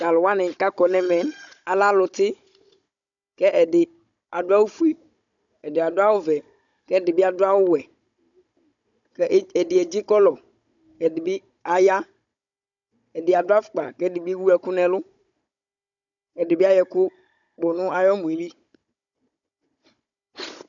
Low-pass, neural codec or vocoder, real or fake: 7.2 kHz; codec, 16 kHz, 16 kbps, FunCodec, trained on Chinese and English, 50 frames a second; fake